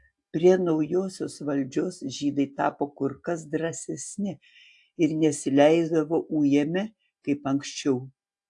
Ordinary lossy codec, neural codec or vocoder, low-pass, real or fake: AAC, 64 kbps; none; 10.8 kHz; real